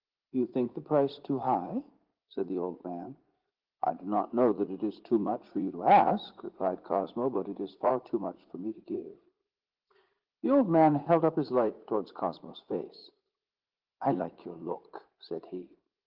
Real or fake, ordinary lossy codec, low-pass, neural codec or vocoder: fake; Opus, 16 kbps; 5.4 kHz; vocoder, 44.1 kHz, 80 mel bands, Vocos